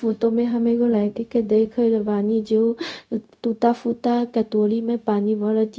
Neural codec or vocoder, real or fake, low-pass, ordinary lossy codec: codec, 16 kHz, 0.4 kbps, LongCat-Audio-Codec; fake; none; none